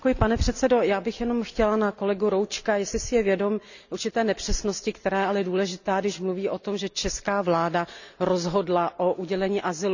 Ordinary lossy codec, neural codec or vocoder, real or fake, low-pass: none; none; real; 7.2 kHz